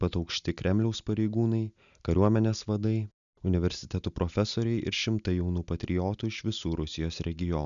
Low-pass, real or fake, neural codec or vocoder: 7.2 kHz; real; none